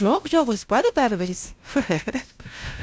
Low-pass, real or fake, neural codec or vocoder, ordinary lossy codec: none; fake; codec, 16 kHz, 0.5 kbps, FunCodec, trained on LibriTTS, 25 frames a second; none